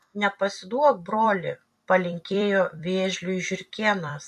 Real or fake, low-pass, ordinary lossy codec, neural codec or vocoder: fake; 14.4 kHz; MP3, 64 kbps; vocoder, 44.1 kHz, 128 mel bands every 256 samples, BigVGAN v2